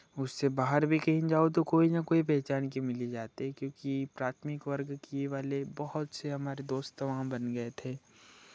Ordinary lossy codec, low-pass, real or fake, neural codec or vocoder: none; none; real; none